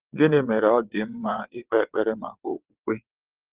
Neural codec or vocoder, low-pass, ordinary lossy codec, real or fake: vocoder, 22.05 kHz, 80 mel bands, WaveNeXt; 3.6 kHz; Opus, 16 kbps; fake